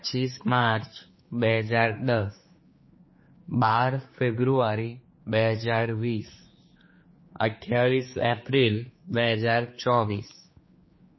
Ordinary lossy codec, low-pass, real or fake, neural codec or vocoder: MP3, 24 kbps; 7.2 kHz; fake; codec, 16 kHz, 4 kbps, X-Codec, HuBERT features, trained on general audio